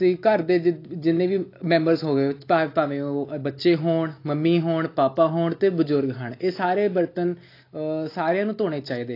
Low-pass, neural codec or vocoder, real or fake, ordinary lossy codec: 5.4 kHz; none; real; AAC, 32 kbps